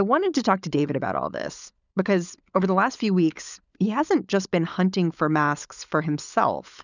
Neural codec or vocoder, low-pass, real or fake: codec, 16 kHz, 16 kbps, FunCodec, trained on LibriTTS, 50 frames a second; 7.2 kHz; fake